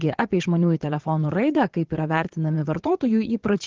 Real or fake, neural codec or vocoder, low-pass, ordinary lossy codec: fake; vocoder, 22.05 kHz, 80 mel bands, Vocos; 7.2 kHz; Opus, 16 kbps